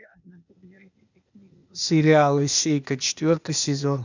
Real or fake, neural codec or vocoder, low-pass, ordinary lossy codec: fake; codec, 16 kHz, 0.8 kbps, ZipCodec; 7.2 kHz; none